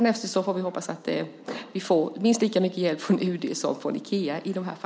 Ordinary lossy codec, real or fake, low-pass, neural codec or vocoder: none; real; none; none